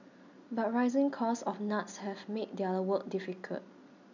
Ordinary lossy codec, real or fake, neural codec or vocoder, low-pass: none; real; none; 7.2 kHz